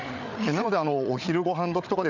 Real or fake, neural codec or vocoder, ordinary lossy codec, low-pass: fake; codec, 16 kHz, 8 kbps, FreqCodec, larger model; none; 7.2 kHz